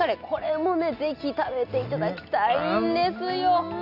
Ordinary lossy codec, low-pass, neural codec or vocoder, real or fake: none; 5.4 kHz; none; real